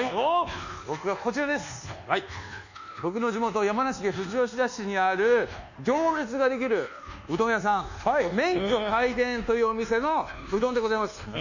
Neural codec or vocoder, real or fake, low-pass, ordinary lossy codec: codec, 24 kHz, 1.2 kbps, DualCodec; fake; 7.2 kHz; none